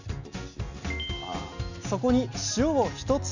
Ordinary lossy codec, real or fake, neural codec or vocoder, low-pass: none; real; none; 7.2 kHz